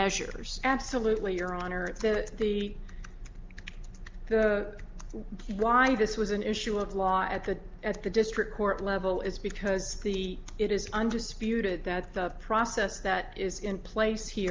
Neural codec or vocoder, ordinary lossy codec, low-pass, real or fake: none; Opus, 24 kbps; 7.2 kHz; real